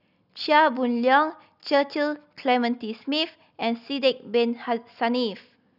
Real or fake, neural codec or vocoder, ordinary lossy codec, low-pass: real; none; none; 5.4 kHz